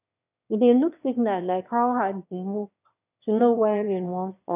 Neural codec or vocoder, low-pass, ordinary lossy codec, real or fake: autoencoder, 22.05 kHz, a latent of 192 numbers a frame, VITS, trained on one speaker; 3.6 kHz; none; fake